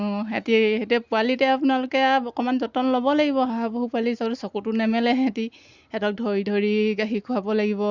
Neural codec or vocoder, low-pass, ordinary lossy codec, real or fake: none; 7.2 kHz; Opus, 64 kbps; real